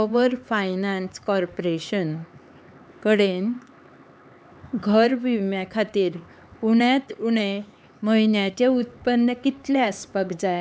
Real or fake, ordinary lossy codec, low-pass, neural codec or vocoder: fake; none; none; codec, 16 kHz, 4 kbps, X-Codec, HuBERT features, trained on LibriSpeech